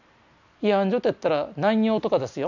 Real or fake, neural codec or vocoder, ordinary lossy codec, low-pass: real; none; none; 7.2 kHz